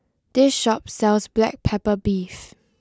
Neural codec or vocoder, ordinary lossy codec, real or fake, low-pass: none; none; real; none